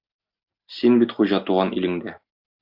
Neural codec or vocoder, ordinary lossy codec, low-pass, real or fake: none; AAC, 48 kbps; 5.4 kHz; real